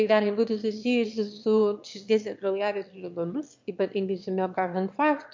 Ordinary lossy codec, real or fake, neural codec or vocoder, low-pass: MP3, 48 kbps; fake; autoencoder, 22.05 kHz, a latent of 192 numbers a frame, VITS, trained on one speaker; 7.2 kHz